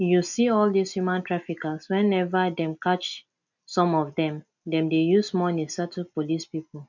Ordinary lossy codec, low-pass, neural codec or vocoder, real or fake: none; 7.2 kHz; none; real